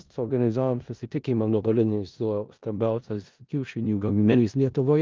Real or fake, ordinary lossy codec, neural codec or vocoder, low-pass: fake; Opus, 32 kbps; codec, 16 kHz in and 24 kHz out, 0.4 kbps, LongCat-Audio-Codec, four codebook decoder; 7.2 kHz